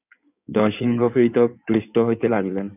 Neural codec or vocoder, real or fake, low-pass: codec, 16 kHz in and 24 kHz out, 2.2 kbps, FireRedTTS-2 codec; fake; 3.6 kHz